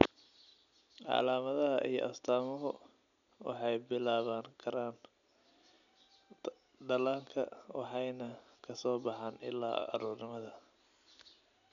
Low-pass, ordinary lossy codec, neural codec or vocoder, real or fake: 7.2 kHz; none; none; real